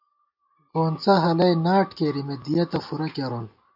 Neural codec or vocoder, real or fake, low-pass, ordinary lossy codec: none; real; 5.4 kHz; AAC, 48 kbps